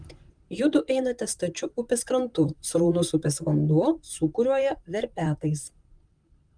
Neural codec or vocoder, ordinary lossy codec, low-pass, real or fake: vocoder, 44.1 kHz, 128 mel bands, Pupu-Vocoder; Opus, 32 kbps; 9.9 kHz; fake